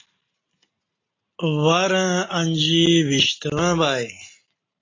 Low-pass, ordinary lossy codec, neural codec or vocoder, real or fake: 7.2 kHz; AAC, 32 kbps; none; real